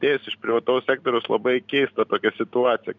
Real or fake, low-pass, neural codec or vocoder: real; 7.2 kHz; none